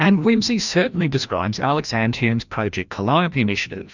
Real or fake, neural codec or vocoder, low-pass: fake; codec, 16 kHz, 1 kbps, FreqCodec, larger model; 7.2 kHz